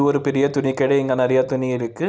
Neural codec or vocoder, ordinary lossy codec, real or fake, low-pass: none; none; real; none